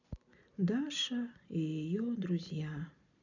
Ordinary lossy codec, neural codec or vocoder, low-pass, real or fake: none; none; 7.2 kHz; real